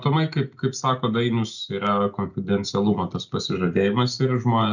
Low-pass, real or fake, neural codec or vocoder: 7.2 kHz; real; none